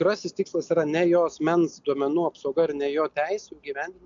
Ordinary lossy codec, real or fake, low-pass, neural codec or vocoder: MP3, 64 kbps; real; 7.2 kHz; none